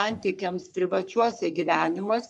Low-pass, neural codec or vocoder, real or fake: 10.8 kHz; codec, 44.1 kHz, 3.4 kbps, Pupu-Codec; fake